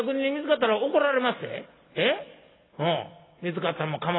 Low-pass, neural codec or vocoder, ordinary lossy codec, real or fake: 7.2 kHz; none; AAC, 16 kbps; real